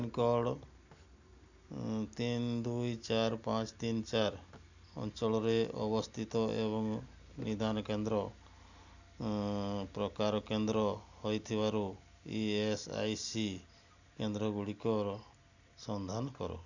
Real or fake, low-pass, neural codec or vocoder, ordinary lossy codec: real; 7.2 kHz; none; none